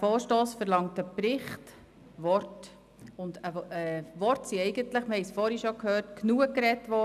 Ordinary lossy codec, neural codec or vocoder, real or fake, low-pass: none; none; real; 14.4 kHz